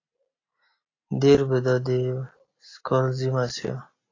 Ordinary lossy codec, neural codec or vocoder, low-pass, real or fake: AAC, 32 kbps; none; 7.2 kHz; real